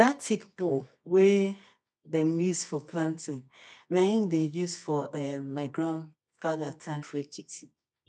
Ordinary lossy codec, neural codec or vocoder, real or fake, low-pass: none; codec, 24 kHz, 0.9 kbps, WavTokenizer, medium music audio release; fake; none